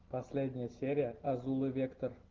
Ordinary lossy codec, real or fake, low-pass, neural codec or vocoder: Opus, 16 kbps; real; 7.2 kHz; none